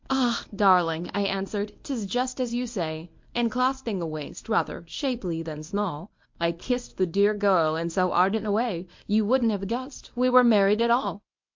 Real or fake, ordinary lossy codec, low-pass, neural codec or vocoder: fake; MP3, 48 kbps; 7.2 kHz; codec, 24 kHz, 0.9 kbps, WavTokenizer, medium speech release version 1